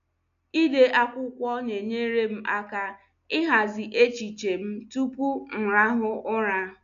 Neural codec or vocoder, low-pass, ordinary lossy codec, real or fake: none; 7.2 kHz; MP3, 96 kbps; real